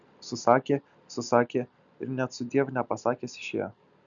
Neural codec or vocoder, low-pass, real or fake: none; 7.2 kHz; real